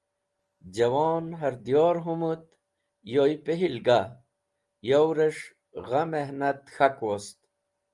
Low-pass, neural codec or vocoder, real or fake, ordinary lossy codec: 10.8 kHz; none; real; Opus, 32 kbps